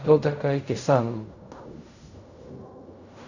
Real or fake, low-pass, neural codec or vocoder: fake; 7.2 kHz; codec, 16 kHz in and 24 kHz out, 0.4 kbps, LongCat-Audio-Codec, fine tuned four codebook decoder